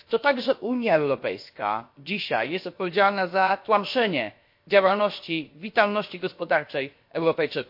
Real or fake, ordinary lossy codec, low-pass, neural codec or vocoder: fake; MP3, 32 kbps; 5.4 kHz; codec, 16 kHz, about 1 kbps, DyCAST, with the encoder's durations